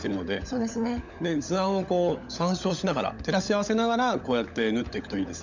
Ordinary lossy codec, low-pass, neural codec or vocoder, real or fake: none; 7.2 kHz; codec, 16 kHz, 16 kbps, FunCodec, trained on LibriTTS, 50 frames a second; fake